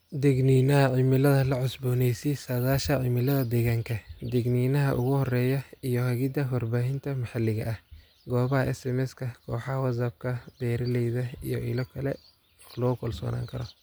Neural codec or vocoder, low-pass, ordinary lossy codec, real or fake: none; none; none; real